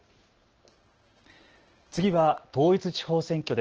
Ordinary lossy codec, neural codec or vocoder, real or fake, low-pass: Opus, 16 kbps; none; real; 7.2 kHz